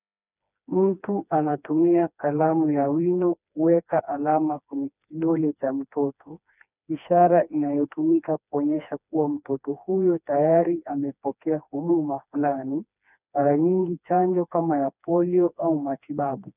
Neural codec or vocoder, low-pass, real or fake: codec, 16 kHz, 2 kbps, FreqCodec, smaller model; 3.6 kHz; fake